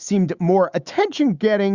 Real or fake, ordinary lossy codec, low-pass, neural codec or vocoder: fake; Opus, 64 kbps; 7.2 kHz; autoencoder, 48 kHz, 128 numbers a frame, DAC-VAE, trained on Japanese speech